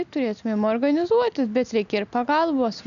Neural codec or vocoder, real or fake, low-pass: none; real; 7.2 kHz